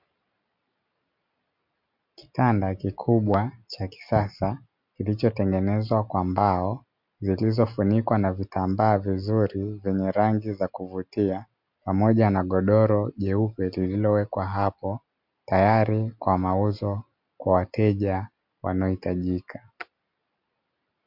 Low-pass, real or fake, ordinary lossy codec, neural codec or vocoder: 5.4 kHz; real; MP3, 48 kbps; none